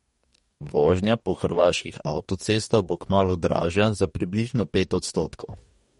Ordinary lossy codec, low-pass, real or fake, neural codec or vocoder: MP3, 48 kbps; 19.8 kHz; fake; codec, 44.1 kHz, 2.6 kbps, DAC